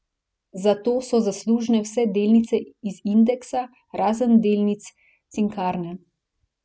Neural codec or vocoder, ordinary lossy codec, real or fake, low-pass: none; none; real; none